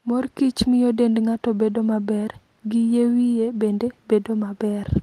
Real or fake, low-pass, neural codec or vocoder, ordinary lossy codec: real; 14.4 kHz; none; Opus, 24 kbps